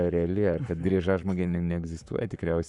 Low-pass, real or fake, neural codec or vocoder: 10.8 kHz; fake; codec, 44.1 kHz, 7.8 kbps, Pupu-Codec